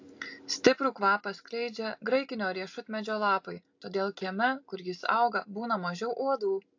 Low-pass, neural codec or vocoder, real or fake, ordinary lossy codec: 7.2 kHz; none; real; AAC, 48 kbps